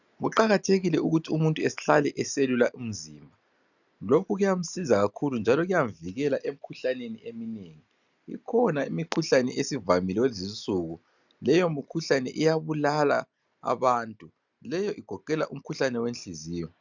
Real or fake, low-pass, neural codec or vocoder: real; 7.2 kHz; none